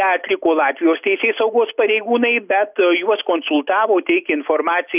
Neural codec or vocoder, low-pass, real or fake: none; 3.6 kHz; real